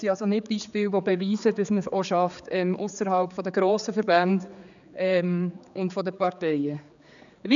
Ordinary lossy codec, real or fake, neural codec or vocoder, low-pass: none; fake; codec, 16 kHz, 4 kbps, X-Codec, HuBERT features, trained on general audio; 7.2 kHz